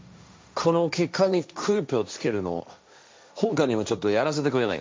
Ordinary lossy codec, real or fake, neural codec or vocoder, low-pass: none; fake; codec, 16 kHz, 1.1 kbps, Voila-Tokenizer; none